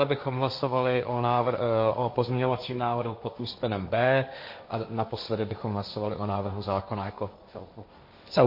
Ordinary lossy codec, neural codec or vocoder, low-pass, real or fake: MP3, 32 kbps; codec, 16 kHz, 1.1 kbps, Voila-Tokenizer; 5.4 kHz; fake